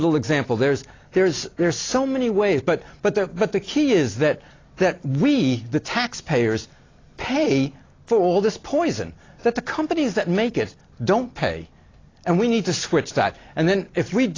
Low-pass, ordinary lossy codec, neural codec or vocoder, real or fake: 7.2 kHz; AAC, 32 kbps; none; real